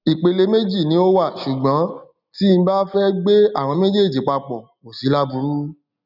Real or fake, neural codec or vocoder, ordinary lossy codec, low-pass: real; none; none; 5.4 kHz